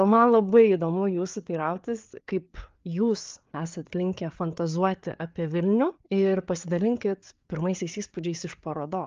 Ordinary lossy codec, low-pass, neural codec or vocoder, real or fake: Opus, 32 kbps; 7.2 kHz; codec, 16 kHz, 4 kbps, FreqCodec, larger model; fake